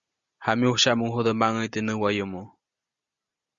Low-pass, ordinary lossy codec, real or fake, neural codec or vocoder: 7.2 kHz; Opus, 64 kbps; real; none